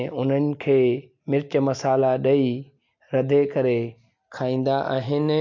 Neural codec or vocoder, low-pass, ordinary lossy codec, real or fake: none; 7.2 kHz; MP3, 64 kbps; real